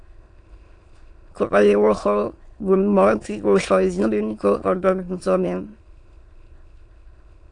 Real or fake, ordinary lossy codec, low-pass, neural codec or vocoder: fake; AAC, 48 kbps; 9.9 kHz; autoencoder, 22.05 kHz, a latent of 192 numbers a frame, VITS, trained on many speakers